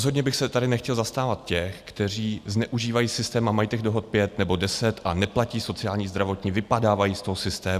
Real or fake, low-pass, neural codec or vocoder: real; 14.4 kHz; none